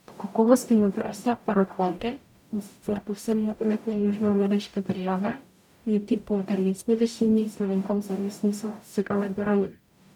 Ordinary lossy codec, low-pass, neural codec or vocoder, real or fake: none; 19.8 kHz; codec, 44.1 kHz, 0.9 kbps, DAC; fake